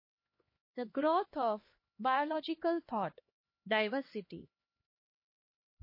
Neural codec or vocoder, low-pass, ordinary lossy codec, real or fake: codec, 16 kHz, 2 kbps, X-Codec, HuBERT features, trained on LibriSpeech; 5.4 kHz; MP3, 24 kbps; fake